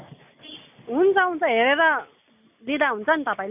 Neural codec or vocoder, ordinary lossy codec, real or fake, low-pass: none; none; real; 3.6 kHz